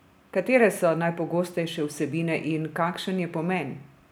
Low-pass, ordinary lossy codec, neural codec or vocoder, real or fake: none; none; none; real